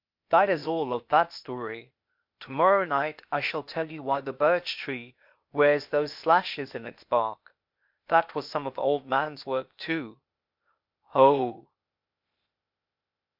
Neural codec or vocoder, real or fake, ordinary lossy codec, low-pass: codec, 16 kHz, 0.8 kbps, ZipCodec; fake; MP3, 48 kbps; 5.4 kHz